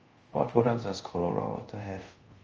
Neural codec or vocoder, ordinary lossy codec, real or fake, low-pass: codec, 24 kHz, 0.5 kbps, DualCodec; Opus, 24 kbps; fake; 7.2 kHz